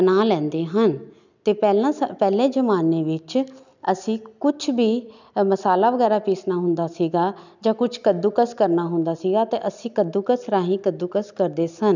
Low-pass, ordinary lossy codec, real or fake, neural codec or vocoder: 7.2 kHz; none; real; none